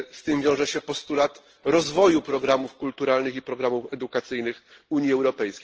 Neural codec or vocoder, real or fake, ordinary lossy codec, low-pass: none; real; Opus, 16 kbps; 7.2 kHz